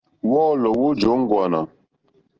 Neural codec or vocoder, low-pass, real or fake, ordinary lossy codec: none; 7.2 kHz; real; Opus, 16 kbps